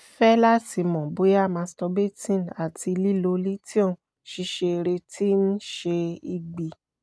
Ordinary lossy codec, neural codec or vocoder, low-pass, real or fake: none; none; none; real